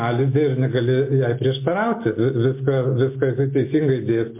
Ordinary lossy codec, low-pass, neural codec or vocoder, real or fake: MP3, 24 kbps; 3.6 kHz; none; real